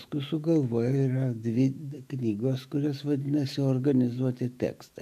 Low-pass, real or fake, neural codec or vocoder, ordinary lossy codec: 14.4 kHz; fake; autoencoder, 48 kHz, 128 numbers a frame, DAC-VAE, trained on Japanese speech; MP3, 96 kbps